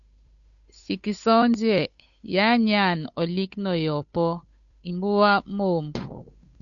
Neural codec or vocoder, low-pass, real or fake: codec, 16 kHz, 8 kbps, FunCodec, trained on Chinese and English, 25 frames a second; 7.2 kHz; fake